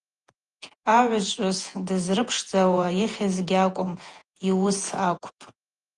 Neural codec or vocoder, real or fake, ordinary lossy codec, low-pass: vocoder, 48 kHz, 128 mel bands, Vocos; fake; Opus, 24 kbps; 10.8 kHz